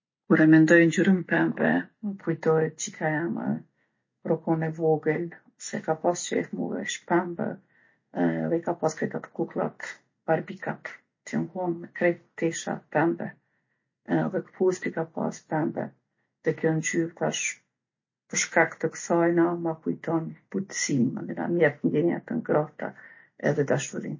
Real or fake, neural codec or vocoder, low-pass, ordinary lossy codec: real; none; 7.2 kHz; MP3, 32 kbps